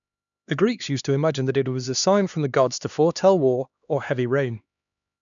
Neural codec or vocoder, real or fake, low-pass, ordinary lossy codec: codec, 16 kHz, 2 kbps, X-Codec, HuBERT features, trained on LibriSpeech; fake; 7.2 kHz; none